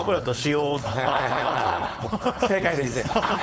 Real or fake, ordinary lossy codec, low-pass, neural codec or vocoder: fake; none; none; codec, 16 kHz, 4.8 kbps, FACodec